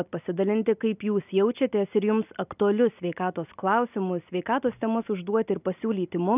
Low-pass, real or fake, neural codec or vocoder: 3.6 kHz; real; none